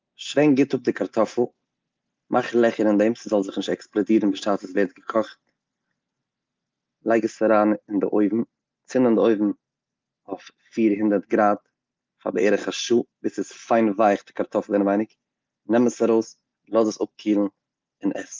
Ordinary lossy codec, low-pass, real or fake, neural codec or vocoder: Opus, 32 kbps; 7.2 kHz; real; none